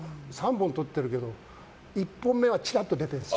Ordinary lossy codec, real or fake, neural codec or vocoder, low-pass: none; real; none; none